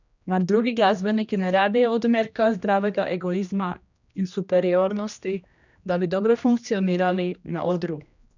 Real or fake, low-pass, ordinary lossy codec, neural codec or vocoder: fake; 7.2 kHz; none; codec, 16 kHz, 1 kbps, X-Codec, HuBERT features, trained on general audio